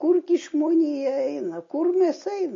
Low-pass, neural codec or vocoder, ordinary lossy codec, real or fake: 7.2 kHz; none; MP3, 32 kbps; real